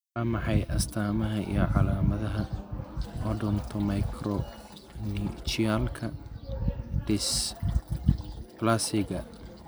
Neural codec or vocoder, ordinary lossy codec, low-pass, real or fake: none; none; none; real